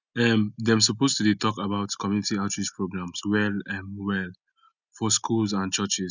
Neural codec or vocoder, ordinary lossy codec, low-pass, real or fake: none; none; 7.2 kHz; real